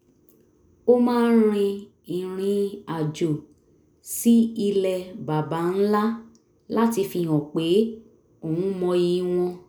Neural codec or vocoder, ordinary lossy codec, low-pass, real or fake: none; none; none; real